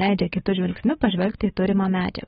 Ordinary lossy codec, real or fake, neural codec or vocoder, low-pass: AAC, 16 kbps; fake; codec, 24 kHz, 3.1 kbps, DualCodec; 10.8 kHz